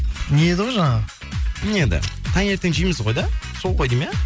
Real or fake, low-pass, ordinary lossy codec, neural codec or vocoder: real; none; none; none